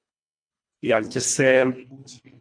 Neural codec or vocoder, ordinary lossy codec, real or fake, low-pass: codec, 24 kHz, 1.5 kbps, HILCodec; MP3, 64 kbps; fake; 9.9 kHz